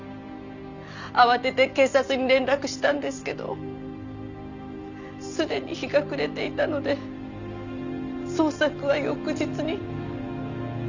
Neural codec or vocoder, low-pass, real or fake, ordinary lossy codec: none; 7.2 kHz; real; none